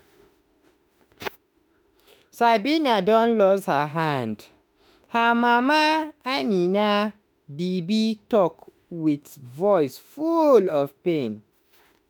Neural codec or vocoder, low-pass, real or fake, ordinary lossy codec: autoencoder, 48 kHz, 32 numbers a frame, DAC-VAE, trained on Japanese speech; none; fake; none